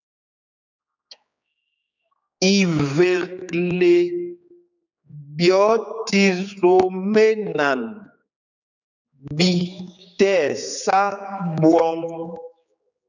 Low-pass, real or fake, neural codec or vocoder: 7.2 kHz; fake; codec, 16 kHz, 4 kbps, X-Codec, HuBERT features, trained on general audio